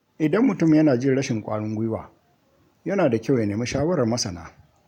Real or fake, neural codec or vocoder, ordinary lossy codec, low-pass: real; none; none; 19.8 kHz